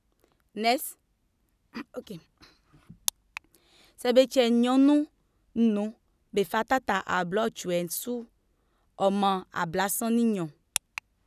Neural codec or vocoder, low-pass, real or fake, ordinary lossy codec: none; 14.4 kHz; real; none